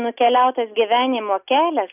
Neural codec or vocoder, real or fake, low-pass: none; real; 3.6 kHz